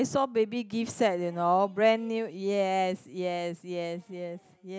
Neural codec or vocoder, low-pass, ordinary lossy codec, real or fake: none; none; none; real